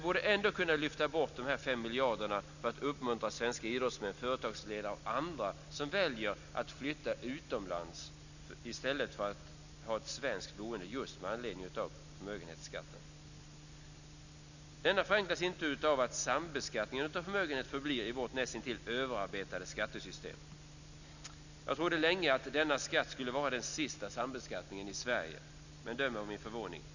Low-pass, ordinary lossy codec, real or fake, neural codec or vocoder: 7.2 kHz; none; real; none